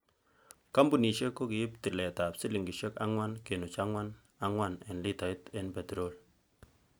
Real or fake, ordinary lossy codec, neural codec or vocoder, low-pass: real; none; none; none